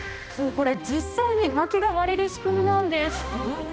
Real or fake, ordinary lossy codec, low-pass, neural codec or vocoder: fake; none; none; codec, 16 kHz, 1 kbps, X-Codec, HuBERT features, trained on balanced general audio